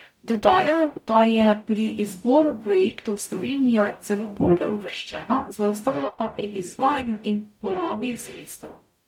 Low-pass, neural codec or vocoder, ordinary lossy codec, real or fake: 19.8 kHz; codec, 44.1 kHz, 0.9 kbps, DAC; MP3, 96 kbps; fake